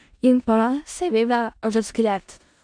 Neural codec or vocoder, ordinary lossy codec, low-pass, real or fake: codec, 16 kHz in and 24 kHz out, 0.4 kbps, LongCat-Audio-Codec, four codebook decoder; none; 9.9 kHz; fake